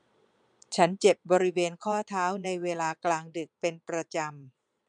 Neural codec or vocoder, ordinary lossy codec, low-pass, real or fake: vocoder, 22.05 kHz, 80 mel bands, Vocos; none; 9.9 kHz; fake